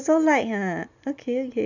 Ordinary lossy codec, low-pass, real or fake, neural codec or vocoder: none; 7.2 kHz; real; none